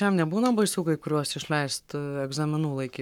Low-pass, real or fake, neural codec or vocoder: 19.8 kHz; fake; codec, 44.1 kHz, 7.8 kbps, Pupu-Codec